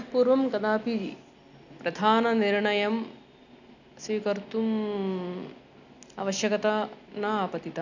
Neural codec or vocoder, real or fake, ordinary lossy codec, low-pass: none; real; none; 7.2 kHz